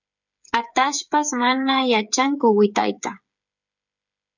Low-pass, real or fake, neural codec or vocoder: 7.2 kHz; fake; codec, 16 kHz, 8 kbps, FreqCodec, smaller model